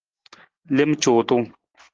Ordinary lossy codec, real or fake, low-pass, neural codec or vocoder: Opus, 16 kbps; real; 7.2 kHz; none